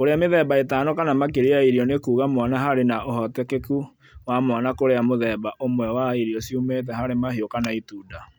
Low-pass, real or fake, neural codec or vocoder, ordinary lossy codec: none; real; none; none